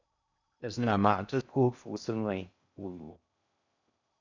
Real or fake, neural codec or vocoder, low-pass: fake; codec, 16 kHz in and 24 kHz out, 0.6 kbps, FocalCodec, streaming, 2048 codes; 7.2 kHz